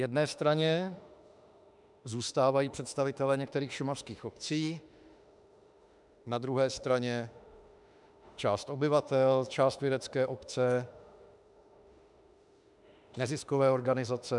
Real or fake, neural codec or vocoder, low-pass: fake; autoencoder, 48 kHz, 32 numbers a frame, DAC-VAE, trained on Japanese speech; 10.8 kHz